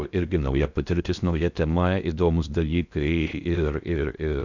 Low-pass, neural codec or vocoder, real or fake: 7.2 kHz; codec, 16 kHz in and 24 kHz out, 0.6 kbps, FocalCodec, streaming, 4096 codes; fake